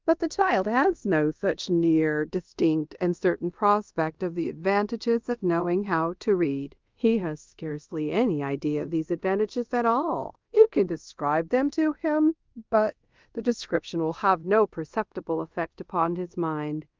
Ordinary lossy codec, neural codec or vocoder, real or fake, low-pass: Opus, 24 kbps; codec, 24 kHz, 0.5 kbps, DualCodec; fake; 7.2 kHz